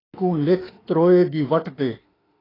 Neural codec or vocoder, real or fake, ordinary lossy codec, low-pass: autoencoder, 48 kHz, 32 numbers a frame, DAC-VAE, trained on Japanese speech; fake; AAC, 24 kbps; 5.4 kHz